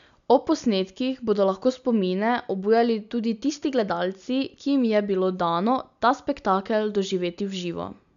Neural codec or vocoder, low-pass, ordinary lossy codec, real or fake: none; 7.2 kHz; none; real